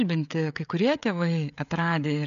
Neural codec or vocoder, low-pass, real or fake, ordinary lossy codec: codec, 16 kHz, 16 kbps, FreqCodec, smaller model; 7.2 kHz; fake; AAC, 96 kbps